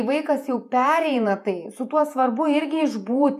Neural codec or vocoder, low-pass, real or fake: vocoder, 48 kHz, 128 mel bands, Vocos; 14.4 kHz; fake